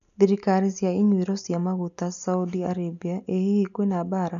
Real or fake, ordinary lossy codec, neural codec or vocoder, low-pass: real; AAC, 96 kbps; none; 7.2 kHz